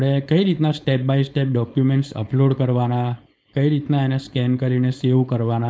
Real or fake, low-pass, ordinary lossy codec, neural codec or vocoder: fake; none; none; codec, 16 kHz, 4.8 kbps, FACodec